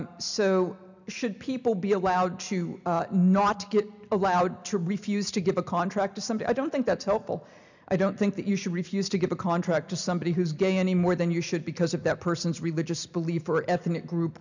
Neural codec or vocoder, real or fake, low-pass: none; real; 7.2 kHz